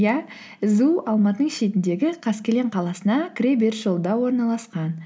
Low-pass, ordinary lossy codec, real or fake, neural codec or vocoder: none; none; real; none